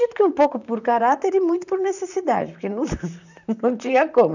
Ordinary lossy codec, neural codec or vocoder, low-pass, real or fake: none; vocoder, 44.1 kHz, 128 mel bands, Pupu-Vocoder; 7.2 kHz; fake